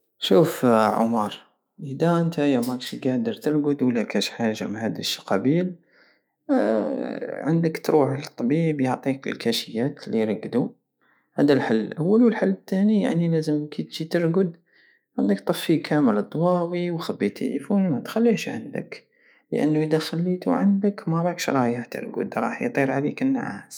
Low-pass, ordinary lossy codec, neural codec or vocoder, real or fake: none; none; autoencoder, 48 kHz, 128 numbers a frame, DAC-VAE, trained on Japanese speech; fake